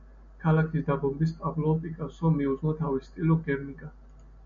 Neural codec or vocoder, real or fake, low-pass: none; real; 7.2 kHz